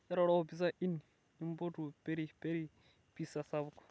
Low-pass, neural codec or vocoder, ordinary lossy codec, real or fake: none; none; none; real